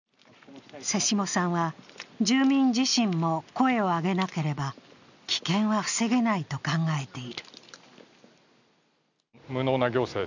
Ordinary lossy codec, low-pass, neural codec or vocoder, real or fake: none; 7.2 kHz; none; real